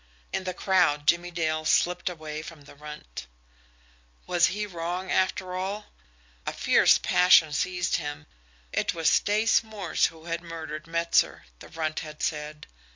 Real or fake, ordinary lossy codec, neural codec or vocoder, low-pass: real; MP3, 48 kbps; none; 7.2 kHz